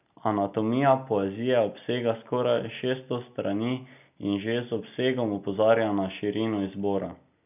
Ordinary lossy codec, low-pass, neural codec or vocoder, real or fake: none; 3.6 kHz; none; real